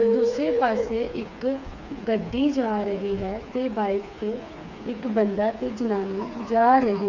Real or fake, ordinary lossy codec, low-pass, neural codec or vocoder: fake; none; 7.2 kHz; codec, 16 kHz, 4 kbps, FreqCodec, smaller model